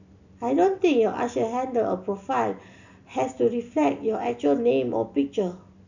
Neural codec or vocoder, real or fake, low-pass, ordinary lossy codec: none; real; 7.2 kHz; none